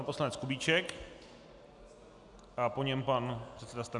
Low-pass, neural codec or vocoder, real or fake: 10.8 kHz; none; real